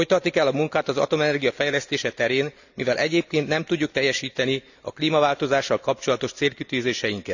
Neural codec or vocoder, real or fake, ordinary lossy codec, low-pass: none; real; none; 7.2 kHz